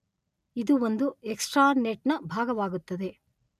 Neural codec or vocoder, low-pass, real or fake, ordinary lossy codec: none; 14.4 kHz; real; none